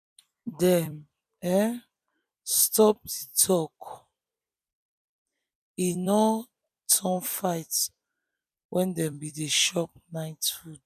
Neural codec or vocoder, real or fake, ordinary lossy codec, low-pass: vocoder, 44.1 kHz, 128 mel bands every 256 samples, BigVGAN v2; fake; none; 14.4 kHz